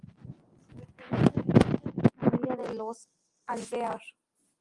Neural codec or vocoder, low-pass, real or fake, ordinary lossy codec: none; 10.8 kHz; real; Opus, 24 kbps